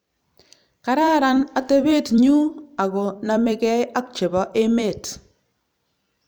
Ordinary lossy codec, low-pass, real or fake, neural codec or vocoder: none; none; fake; vocoder, 44.1 kHz, 128 mel bands every 256 samples, BigVGAN v2